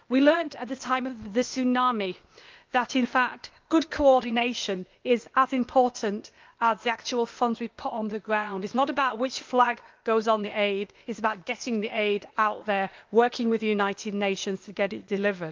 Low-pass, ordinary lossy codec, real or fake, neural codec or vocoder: 7.2 kHz; Opus, 24 kbps; fake; codec, 16 kHz, 0.8 kbps, ZipCodec